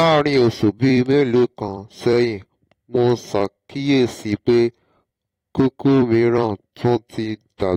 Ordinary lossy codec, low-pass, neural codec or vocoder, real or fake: AAC, 48 kbps; 19.8 kHz; vocoder, 44.1 kHz, 128 mel bands every 256 samples, BigVGAN v2; fake